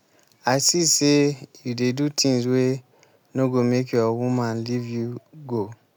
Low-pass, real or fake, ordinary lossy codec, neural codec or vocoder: none; real; none; none